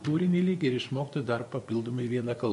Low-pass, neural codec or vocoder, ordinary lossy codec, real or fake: 10.8 kHz; vocoder, 24 kHz, 100 mel bands, Vocos; MP3, 48 kbps; fake